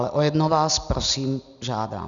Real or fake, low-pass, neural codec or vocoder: real; 7.2 kHz; none